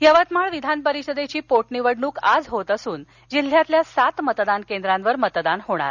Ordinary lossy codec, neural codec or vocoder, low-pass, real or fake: none; none; 7.2 kHz; real